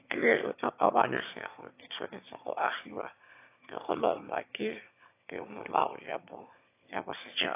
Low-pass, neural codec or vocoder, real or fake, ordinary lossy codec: 3.6 kHz; autoencoder, 22.05 kHz, a latent of 192 numbers a frame, VITS, trained on one speaker; fake; AAC, 24 kbps